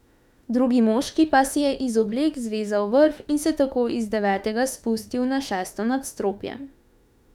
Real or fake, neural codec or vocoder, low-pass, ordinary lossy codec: fake; autoencoder, 48 kHz, 32 numbers a frame, DAC-VAE, trained on Japanese speech; 19.8 kHz; none